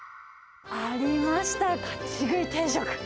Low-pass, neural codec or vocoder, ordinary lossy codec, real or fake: none; none; none; real